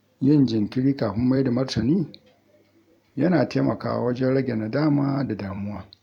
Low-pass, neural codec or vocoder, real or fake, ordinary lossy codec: 19.8 kHz; vocoder, 44.1 kHz, 128 mel bands every 256 samples, BigVGAN v2; fake; none